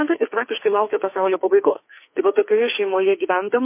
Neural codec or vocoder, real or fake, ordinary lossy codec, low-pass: codec, 44.1 kHz, 2.6 kbps, SNAC; fake; MP3, 24 kbps; 3.6 kHz